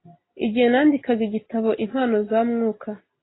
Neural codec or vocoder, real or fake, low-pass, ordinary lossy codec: none; real; 7.2 kHz; AAC, 16 kbps